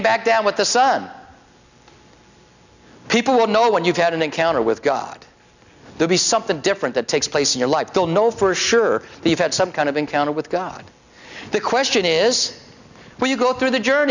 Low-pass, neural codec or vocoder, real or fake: 7.2 kHz; none; real